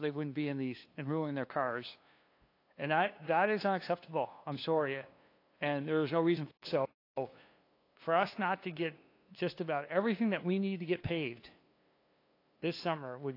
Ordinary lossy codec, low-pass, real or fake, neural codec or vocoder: AAC, 32 kbps; 5.4 kHz; fake; codec, 16 kHz, 2 kbps, FunCodec, trained on LibriTTS, 25 frames a second